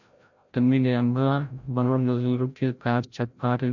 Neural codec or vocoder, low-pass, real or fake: codec, 16 kHz, 0.5 kbps, FreqCodec, larger model; 7.2 kHz; fake